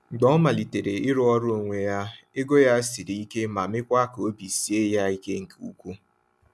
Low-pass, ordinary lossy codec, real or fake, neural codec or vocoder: none; none; real; none